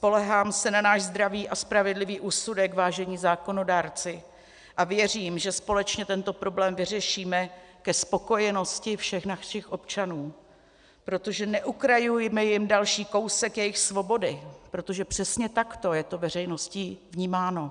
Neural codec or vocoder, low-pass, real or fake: none; 9.9 kHz; real